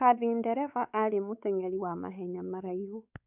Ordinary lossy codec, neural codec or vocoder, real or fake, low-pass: none; codec, 16 kHz, 4 kbps, X-Codec, WavLM features, trained on Multilingual LibriSpeech; fake; 3.6 kHz